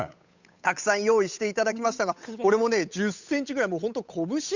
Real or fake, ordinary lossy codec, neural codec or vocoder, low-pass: fake; none; codec, 16 kHz, 8 kbps, FunCodec, trained on Chinese and English, 25 frames a second; 7.2 kHz